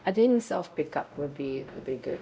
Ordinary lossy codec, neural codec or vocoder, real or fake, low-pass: none; codec, 16 kHz, 0.5 kbps, X-Codec, WavLM features, trained on Multilingual LibriSpeech; fake; none